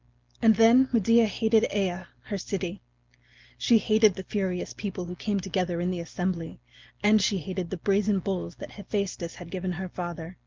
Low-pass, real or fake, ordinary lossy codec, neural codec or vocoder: 7.2 kHz; real; Opus, 32 kbps; none